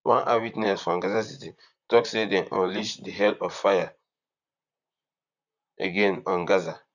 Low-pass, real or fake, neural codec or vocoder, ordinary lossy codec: 7.2 kHz; fake; vocoder, 44.1 kHz, 128 mel bands, Pupu-Vocoder; none